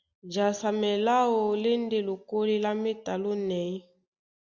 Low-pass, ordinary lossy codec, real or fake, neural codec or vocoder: 7.2 kHz; Opus, 64 kbps; real; none